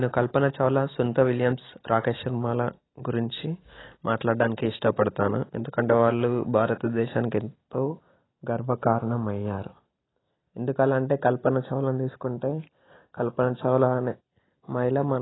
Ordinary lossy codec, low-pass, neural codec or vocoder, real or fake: AAC, 16 kbps; 7.2 kHz; none; real